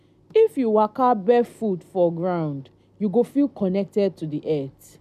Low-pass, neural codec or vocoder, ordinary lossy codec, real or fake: 14.4 kHz; none; none; real